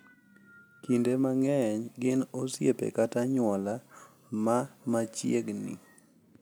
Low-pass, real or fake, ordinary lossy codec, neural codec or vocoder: none; real; none; none